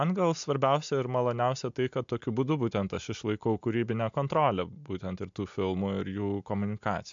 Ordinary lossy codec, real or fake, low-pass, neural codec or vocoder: MP3, 64 kbps; real; 7.2 kHz; none